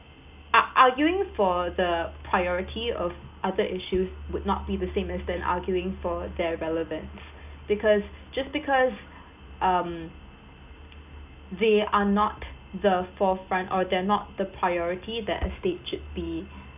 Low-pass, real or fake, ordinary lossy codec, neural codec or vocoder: 3.6 kHz; real; none; none